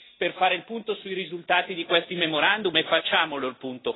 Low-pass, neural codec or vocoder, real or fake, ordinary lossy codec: 7.2 kHz; none; real; AAC, 16 kbps